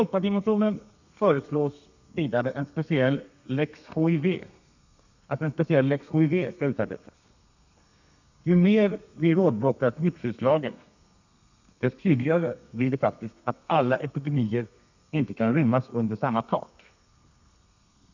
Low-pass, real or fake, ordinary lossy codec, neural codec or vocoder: 7.2 kHz; fake; none; codec, 32 kHz, 1.9 kbps, SNAC